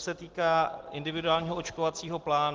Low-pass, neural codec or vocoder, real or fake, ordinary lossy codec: 7.2 kHz; none; real; Opus, 16 kbps